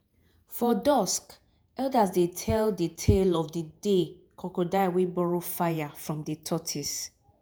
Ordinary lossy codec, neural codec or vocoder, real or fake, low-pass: none; vocoder, 48 kHz, 128 mel bands, Vocos; fake; none